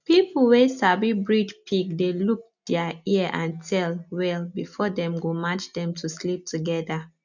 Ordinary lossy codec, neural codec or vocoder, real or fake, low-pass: none; none; real; 7.2 kHz